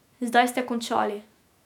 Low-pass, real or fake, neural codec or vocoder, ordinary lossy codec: 19.8 kHz; fake; autoencoder, 48 kHz, 128 numbers a frame, DAC-VAE, trained on Japanese speech; none